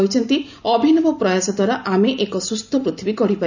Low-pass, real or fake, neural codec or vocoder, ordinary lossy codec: 7.2 kHz; real; none; none